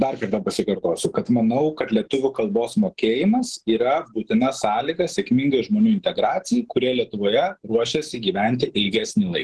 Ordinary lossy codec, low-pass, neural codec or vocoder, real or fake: Opus, 16 kbps; 10.8 kHz; none; real